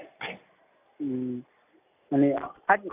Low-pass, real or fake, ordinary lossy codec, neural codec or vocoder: 3.6 kHz; real; none; none